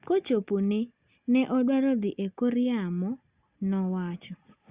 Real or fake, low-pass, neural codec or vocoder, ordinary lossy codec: real; 3.6 kHz; none; Opus, 64 kbps